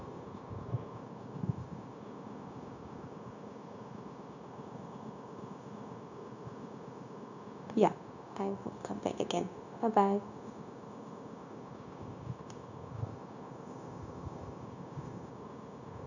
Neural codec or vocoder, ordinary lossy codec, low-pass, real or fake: codec, 16 kHz, 0.9 kbps, LongCat-Audio-Codec; none; 7.2 kHz; fake